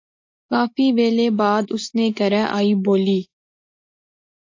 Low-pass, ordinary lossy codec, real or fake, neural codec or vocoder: 7.2 kHz; AAC, 48 kbps; real; none